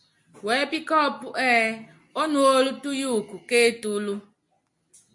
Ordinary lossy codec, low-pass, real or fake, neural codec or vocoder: MP3, 48 kbps; 10.8 kHz; real; none